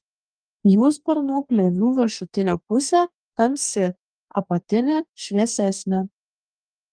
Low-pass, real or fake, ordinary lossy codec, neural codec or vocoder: 9.9 kHz; fake; Opus, 32 kbps; codec, 44.1 kHz, 2.6 kbps, DAC